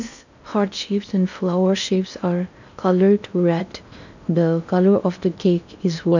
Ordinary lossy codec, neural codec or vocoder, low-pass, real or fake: none; codec, 16 kHz in and 24 kHz out, 0.6 kbps, FocalCodec, streaming, 2048 codes; 7.2 kHz; fake